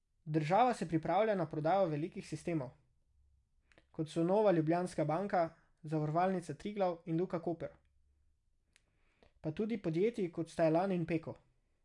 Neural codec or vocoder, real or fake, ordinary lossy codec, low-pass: none; real; none; 10.8 kHz